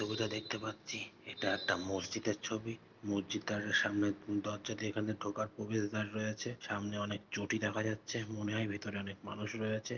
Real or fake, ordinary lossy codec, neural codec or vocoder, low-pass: real; Opus, 32 kbps; none; 7.2 kHz